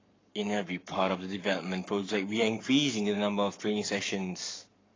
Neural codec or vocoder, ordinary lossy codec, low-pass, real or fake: codec, 44.1 kHz, 7.8 kbps, Pupu-Codec; AAC, 32 kbps; 7.2 kHz; fake